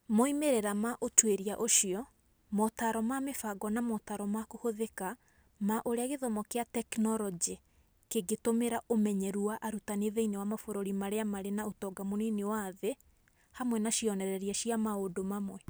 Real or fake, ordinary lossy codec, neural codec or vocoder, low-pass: real; none; none; none